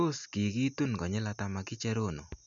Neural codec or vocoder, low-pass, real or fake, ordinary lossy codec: none; 7.2 kHz; real; none